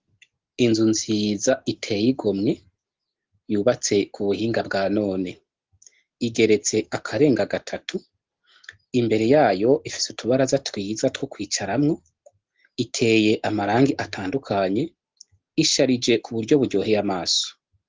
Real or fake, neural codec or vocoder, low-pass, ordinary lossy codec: real; none; 7.2 kHz; Opus, 16 kbps